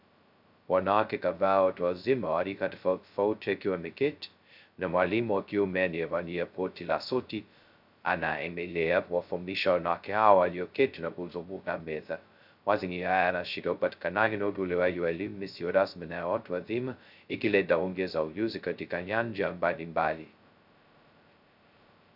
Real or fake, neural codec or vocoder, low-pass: fake; codec, 16 kHz, 0.2 kbps, FocalCodec; 5.4 kHz